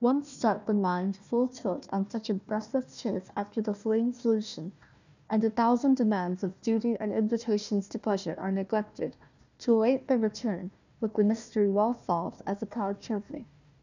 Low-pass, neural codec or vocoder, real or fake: 7.2 kHz; codec, 16 kHz, 1 kbps, FunCodec, trained on Chinese and English, 50 frames a second; fake